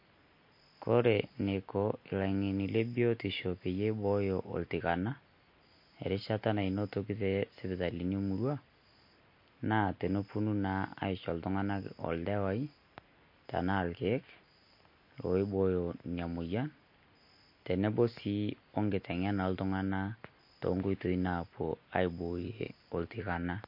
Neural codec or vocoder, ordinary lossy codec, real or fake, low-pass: none; MP3, 32 kbps; real; 5.4 kHz